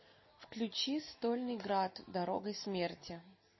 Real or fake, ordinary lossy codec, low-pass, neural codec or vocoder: real; MP3, 24 kbps; 7.2 kHz; none